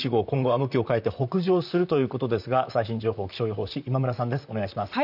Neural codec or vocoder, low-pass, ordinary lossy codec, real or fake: vocoder, 44.1 kHz, 128 mel bands, Pupu-Vocoder; 5.4 kHz; none; fake